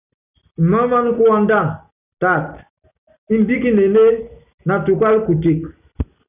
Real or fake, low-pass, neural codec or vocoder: real; 3.6 kHz; none